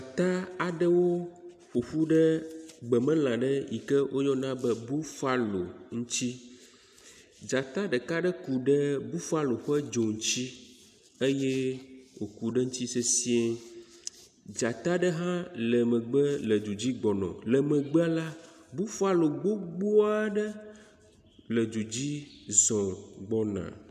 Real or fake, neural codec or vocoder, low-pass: real; none; 14.4 kHz